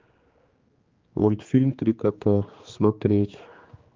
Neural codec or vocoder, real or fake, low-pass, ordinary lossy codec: codec, 16 kHz, 2 kbps, X-Codec, HuBERT features, trained on general audio; fake; 7.2 kHz; Opus, 32 kbps